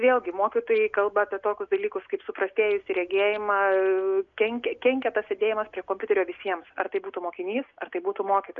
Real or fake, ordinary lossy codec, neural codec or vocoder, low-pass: real; MP3, 96 kbps; none; 7.2 kHz